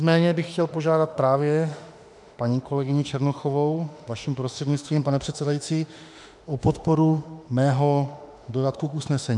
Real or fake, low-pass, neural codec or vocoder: fake; 10.8 kHz; autoencoder, 48 kHz, 32 numbers a frame, DAC-VAE, trained on Japanese speech